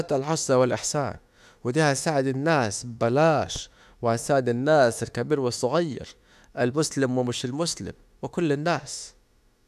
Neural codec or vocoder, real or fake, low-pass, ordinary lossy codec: autoencoder, 48 kHz, 32 numbers a frame, DAC-VAE, trained on Japanese speech; fake; 14.4 kHz; none